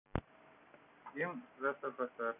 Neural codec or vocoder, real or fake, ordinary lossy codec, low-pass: none; real; none; 3.6 kHz